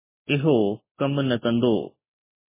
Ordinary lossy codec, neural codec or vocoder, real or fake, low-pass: MP3, 16 kbps; none; real; 3.6 kHz